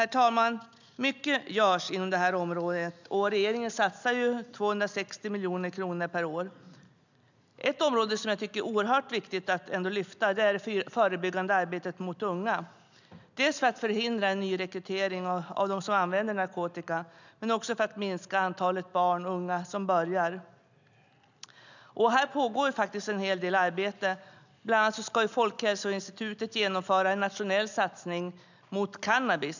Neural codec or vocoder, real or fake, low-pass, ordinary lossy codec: none; real; 7.2 kHz; none